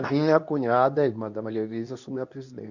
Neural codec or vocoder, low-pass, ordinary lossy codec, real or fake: codec, 24 kHz, 0.9 kbps, WavTokenizer, medium speech release version 2; 7.2 kHz; none; fake